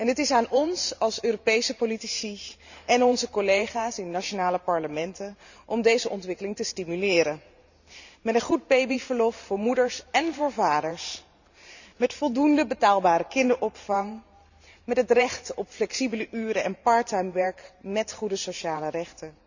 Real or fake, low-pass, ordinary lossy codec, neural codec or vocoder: fake; 7.2 kHz; none; vocoder, 44.1 kHz, 128 mel bands every 512 samples, BigVGAN v2